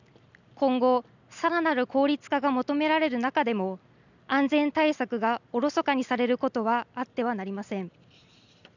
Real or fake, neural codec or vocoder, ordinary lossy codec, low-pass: real; none; none; 7.2 kHz